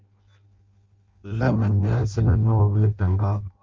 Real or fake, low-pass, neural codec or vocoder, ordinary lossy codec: fake; 7.2 kHz; codec, 16 kHz in and 24 kHz out, 0.6 kbps, FireRedTTS-2 codec; Opus, 32 kbps